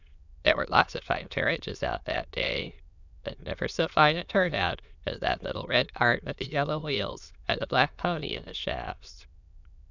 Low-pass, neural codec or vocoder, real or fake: 7.2 kHz; autoencoder, 22.05 kHz, a latent of 192 numbers a frame, VITS, trained on many speakers; fake